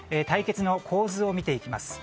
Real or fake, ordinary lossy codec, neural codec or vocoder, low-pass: real; none; none; none